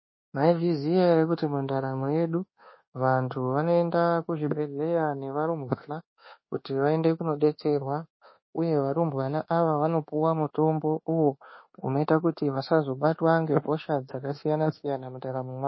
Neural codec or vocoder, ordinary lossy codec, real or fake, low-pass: codec, 24 kHz, 1.2 kbps, DualCodec; MP3, 24 kbps; fake; 7.2 kHz